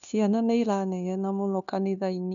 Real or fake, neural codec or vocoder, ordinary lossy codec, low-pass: fake; codec, 16 kHz, 0.9 kbps, LongCat-Audio-Codec; none; 7.2 kHz